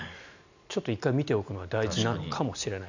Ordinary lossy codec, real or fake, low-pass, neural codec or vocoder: none; real; 7.2 kHz; none